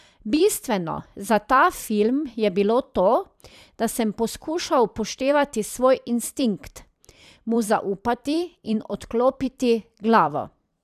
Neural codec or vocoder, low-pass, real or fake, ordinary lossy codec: vocoder, 44.1 kHz, 128 mel bands every 256 samples, BigVGAN v2; 14.4 kHz; fake; none